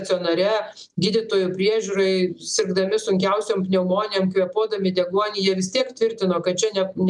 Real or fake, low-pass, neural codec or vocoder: real; 10.8 kHz; none